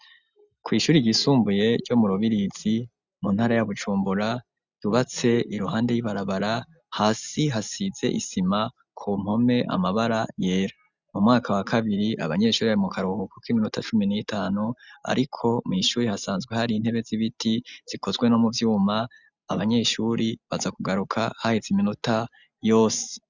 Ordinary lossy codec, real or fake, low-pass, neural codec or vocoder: Opus, 64 kbps; real; 7.2 kHz; none